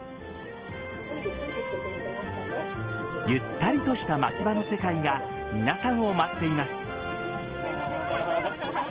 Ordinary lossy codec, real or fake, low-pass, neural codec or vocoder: Opus, 16 kbps; real; 3.6 kHz; none